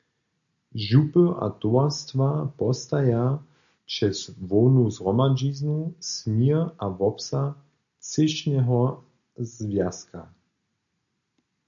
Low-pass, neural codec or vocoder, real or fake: 7.2 kHz; none; real